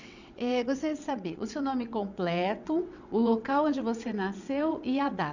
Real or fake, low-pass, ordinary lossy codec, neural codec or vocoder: fake; 7.2 kHz; none; vocoder, 22.05 kHz, 80 mel bands, WaveNeXt